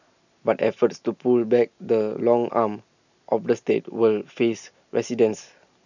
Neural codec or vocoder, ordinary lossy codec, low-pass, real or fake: none; none; 7.2 kHz; real